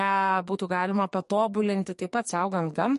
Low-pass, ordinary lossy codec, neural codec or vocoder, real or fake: 14.4 kHz; MP3, 48 kbps; codec, 44.1 kHz, 2.6 kbps, SNAC; fake